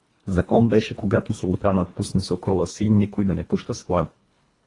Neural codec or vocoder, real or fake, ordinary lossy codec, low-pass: codec, 24 kHz, 1.5 kbps, HILCodec; fake; AAC, 32 kbps; 10.8 kHz